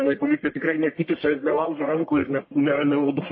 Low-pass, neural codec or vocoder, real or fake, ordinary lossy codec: 7.2 kHz; codec, 44.1 kHz, 1.7 kbps, Pupu-Codec; fake; MP3, 24 kbps